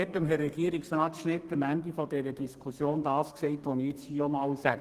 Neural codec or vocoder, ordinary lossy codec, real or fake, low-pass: codec, 32 kHz, 1.9 kbps, SNAC; Opus, 24 kbps; fake; 14.4 kHz